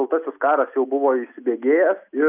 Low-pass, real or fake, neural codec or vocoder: 3.6 kHz; real; none